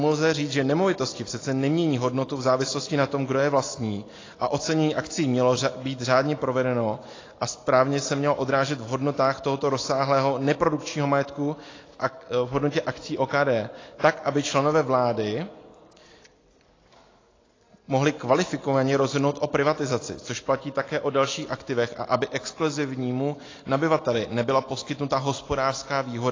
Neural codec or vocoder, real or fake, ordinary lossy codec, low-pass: none; real; AAC, 32 kbps; 7.2 kHz